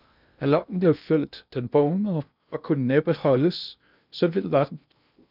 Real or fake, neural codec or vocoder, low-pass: fake; codec, 16 kHz in and 24 kHz out, 0.6 kbps, FocalCodec, streaming, 2048 codes; 5.4 kHz